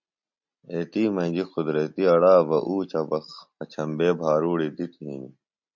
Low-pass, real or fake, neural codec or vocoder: 7.2 kHz; real; none